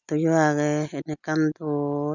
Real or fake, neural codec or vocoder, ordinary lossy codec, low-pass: real; none; none; 7.2 kHz